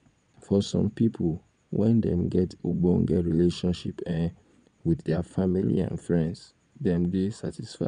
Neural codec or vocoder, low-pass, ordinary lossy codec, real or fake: vocoder, 22.05 kHz, 80 mel bands, Vocos; 9.9 kHz; none; fake